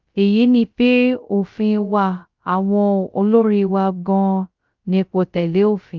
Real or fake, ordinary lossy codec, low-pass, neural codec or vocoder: fake; Opus, 24 kbps; 7.2 kHz; codec, 16 kHz, 0.2 kbps, FocalCodec